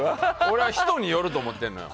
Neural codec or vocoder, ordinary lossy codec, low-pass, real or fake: none; none; none; real